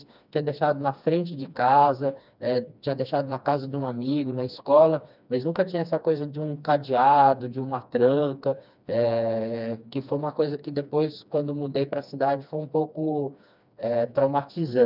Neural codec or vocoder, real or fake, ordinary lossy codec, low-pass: codec, 16 kHz, 2 kbps, FreqCodec, smaller model; fake; none; 5.4 kHz